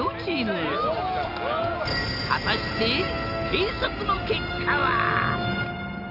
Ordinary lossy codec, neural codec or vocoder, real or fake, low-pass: none; none; real; 5.4 kHz